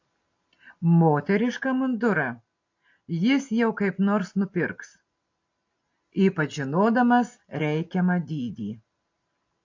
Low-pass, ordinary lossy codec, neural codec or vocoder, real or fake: 7.2 kHz; AAC, 48 kbps; none; real